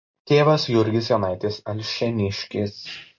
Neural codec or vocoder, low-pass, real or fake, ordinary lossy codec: none; 7.2 kHz; real; MP3, 48 kbps